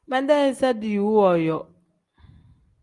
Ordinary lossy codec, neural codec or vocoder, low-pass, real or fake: Opus, 24 kbps; none; 10.8 kHz; real